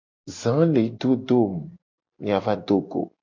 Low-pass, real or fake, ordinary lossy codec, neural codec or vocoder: 7.2 kHz; real; MP3, 48 kbps; none